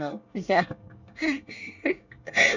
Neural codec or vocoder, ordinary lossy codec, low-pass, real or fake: codec, 24 kHz, 1 kbps, SNAC; none; 7.2 kHz; fake